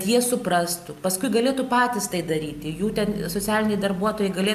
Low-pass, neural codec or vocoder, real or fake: 14.4 kHz; none; real